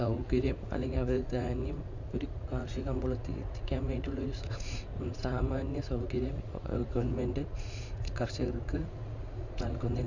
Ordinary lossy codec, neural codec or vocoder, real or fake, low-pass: none; vocoder, 44.1 kHz, 80 mel bands, Vocos; fake; 7.2 kHz